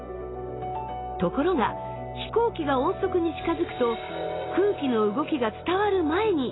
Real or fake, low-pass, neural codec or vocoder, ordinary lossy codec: real; 7.2 kHz; none; AAC, 16 kbps